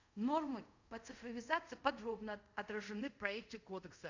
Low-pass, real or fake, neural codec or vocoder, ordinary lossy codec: 7.2 kHz; fake; codec, 24 kHz, 0.5 kbps, DualCodec; none